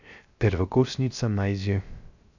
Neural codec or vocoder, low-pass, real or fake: codec, 16 kHz, 0.3 kbps, FocalCodec; 7.2 kHz; fake